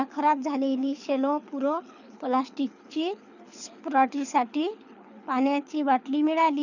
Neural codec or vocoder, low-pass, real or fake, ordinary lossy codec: codec, 24 kHz, 6 kbps, HILCodec; 7.2 kHz; fake; none